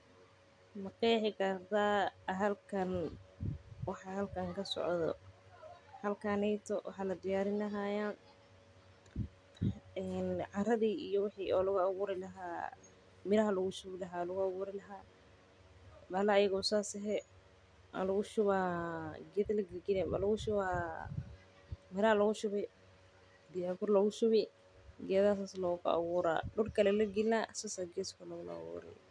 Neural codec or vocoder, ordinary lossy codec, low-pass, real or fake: none; none; 9.9 kHz; real